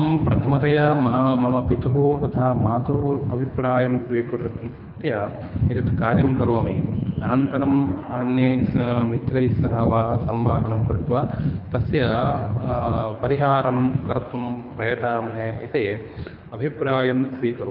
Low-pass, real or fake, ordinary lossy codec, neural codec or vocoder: 5.4 kHz; fake; none; codec, 24 kHz, 3 kbps, HILCodec